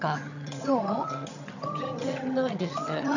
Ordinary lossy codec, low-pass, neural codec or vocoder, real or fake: none; 7.2 kHz; vocoder, 22.05 kHz, 80 mel bands, HiFi-GAN; fake